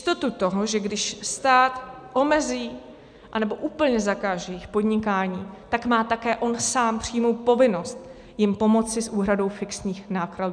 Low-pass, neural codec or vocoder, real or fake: 9.9 kHz; none; real